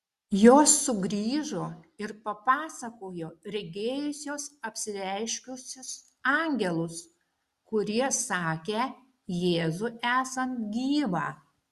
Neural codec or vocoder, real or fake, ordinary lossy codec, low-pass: none; real; Opus, 64 kbps; 14.4 kHz